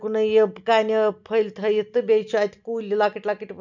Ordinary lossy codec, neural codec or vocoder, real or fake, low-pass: none; none; real; 7.2 kHz